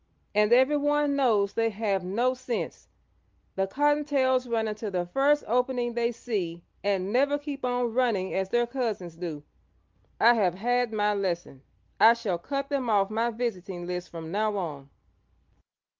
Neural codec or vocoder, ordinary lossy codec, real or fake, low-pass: none; Opus, 32 kbps; real; 7.2 kHz